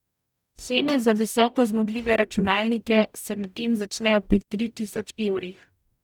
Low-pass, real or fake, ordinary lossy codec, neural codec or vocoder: 19.8 kHz; fake; none; codec, 44.1 kHz, 0.9 kbps, DAC